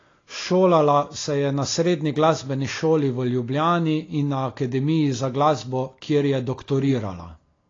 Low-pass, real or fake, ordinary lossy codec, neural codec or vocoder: 7.2 kHz; real; AAC, 32 kbps; none